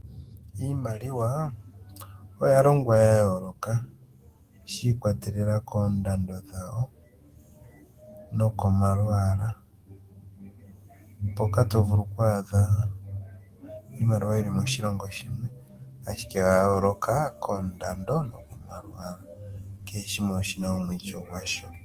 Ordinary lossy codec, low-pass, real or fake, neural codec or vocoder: Opus, 24 kbps; 14.4 kHz; fake; autoencoder, 48 kHz, 128 numbers a frame, DAC-VAE, trained on Japanese speech